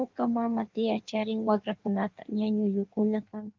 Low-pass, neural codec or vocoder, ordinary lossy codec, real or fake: 7.2 kHz; codec, 16 kHz in and 24 kHz out, 1.1 kbps, FireRedTTS-2 codec; Opus, 32 kbps; fake